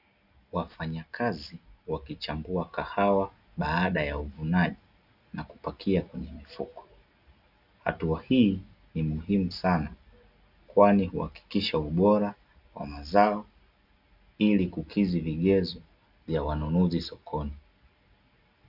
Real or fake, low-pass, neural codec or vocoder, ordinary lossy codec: real; 5.4 kHz; none; Opus, 64 kbps